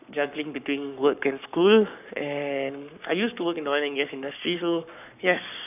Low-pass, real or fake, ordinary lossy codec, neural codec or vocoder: 3.6 kHz; fake; none; codec, 44.1 kHz, 7.8 kbps, Pupu-Codec